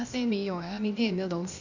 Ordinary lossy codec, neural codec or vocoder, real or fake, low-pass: none; codec, 16 kHz, 0.8 kbps, ZipCodec; fake; 7.2 kHz